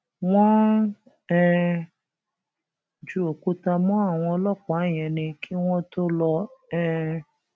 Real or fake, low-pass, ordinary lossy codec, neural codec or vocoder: real; none; none; none